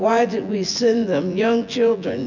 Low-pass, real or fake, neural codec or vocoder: 7.2 kHz; fake; vocoder, 24 kHz, 100 mel bands, Vocos